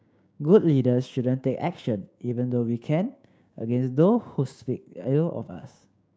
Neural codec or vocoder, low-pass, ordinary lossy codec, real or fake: codec, 16 kHz, 6 kbps, DAC; none; none; fake